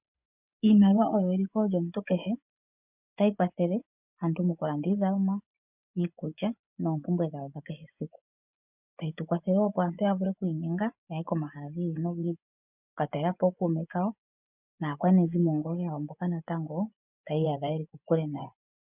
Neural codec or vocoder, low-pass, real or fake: vocoder, 24 kHz, 100 mel bands, Vocos; 3.6 kHz; fake